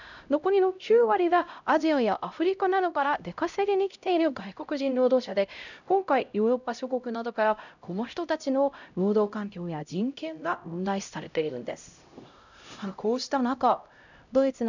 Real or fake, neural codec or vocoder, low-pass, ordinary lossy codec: fake; codec, 16 kHz, 0.5 kbps, X-Codec, HuBERT features, trained on LibriSpeech; 7.2 kHz; none